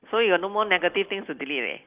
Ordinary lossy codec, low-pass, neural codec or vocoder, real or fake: Opus, 24 kbps; 3.6 kHz; none; real